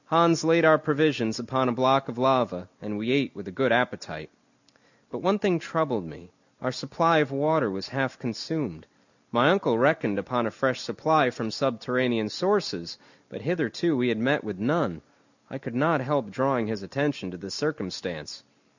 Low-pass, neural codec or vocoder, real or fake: 7.2 kHz; none; real